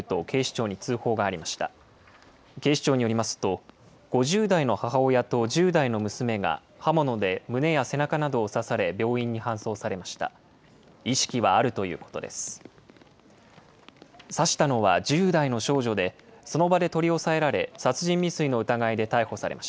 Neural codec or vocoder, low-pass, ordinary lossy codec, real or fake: none; none; none; real